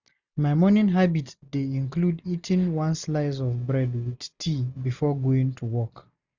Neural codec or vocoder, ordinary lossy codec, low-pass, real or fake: none; none; 7.2 kHz; real